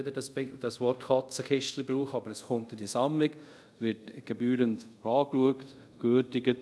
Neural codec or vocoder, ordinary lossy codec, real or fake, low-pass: codec, 24 kHz, 0.5 kbps, DualCodec; none; fake; none